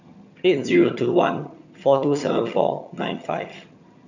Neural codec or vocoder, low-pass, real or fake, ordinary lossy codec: vocoder, 22.05 kHz, 80 mel bands, HiFi-GAN; 7.2 kHz; fake; none